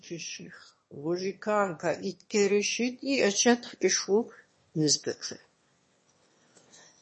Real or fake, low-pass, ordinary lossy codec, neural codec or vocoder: fake; 9.9 kHz; MP3, 32 kbps; autoencoder, 22.05 kHz, a latent of 192 numbers a frame, VITS, trained on one speaker